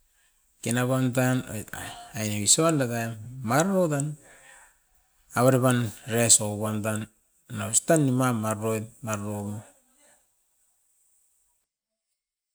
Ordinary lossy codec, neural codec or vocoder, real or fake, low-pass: none; none; real; none